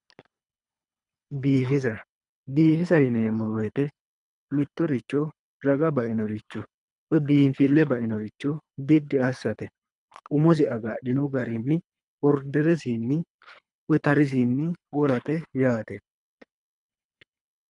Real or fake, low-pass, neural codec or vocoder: fake; 10.8 kHz; codec, 24 kHz, 3 kbps, HILCodec